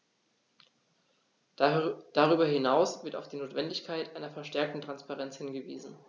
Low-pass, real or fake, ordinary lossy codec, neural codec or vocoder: 7.2 kHz; real; none; none